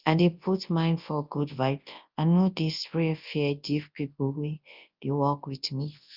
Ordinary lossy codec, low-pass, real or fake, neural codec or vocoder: Opus, 24 kbps; 5.4 kHz; fake; codec, 24 kHz, 0.9 kbps, WavTokenizer, large speech release